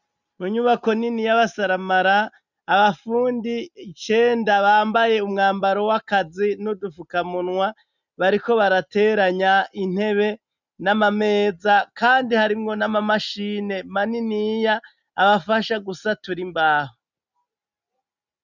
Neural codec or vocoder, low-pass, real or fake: none; 7.2 kHz; real